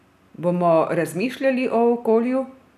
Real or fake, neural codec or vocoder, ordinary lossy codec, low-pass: real; none; none; 14.4 kHz